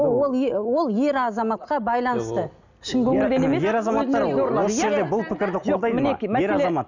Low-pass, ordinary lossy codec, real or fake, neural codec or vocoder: 7.2 kHz; none; real; none